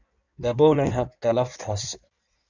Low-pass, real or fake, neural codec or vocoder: 7.2 kHz; fake; codec, 16 kHz in and 24 kHz out, 1.1 kbps, FireRedTTS-2 codec